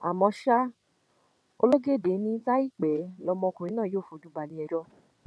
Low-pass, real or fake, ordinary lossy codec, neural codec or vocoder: none; fake; none; vocoder, 22.05 kHz, 80 mel bands, WaveNeXt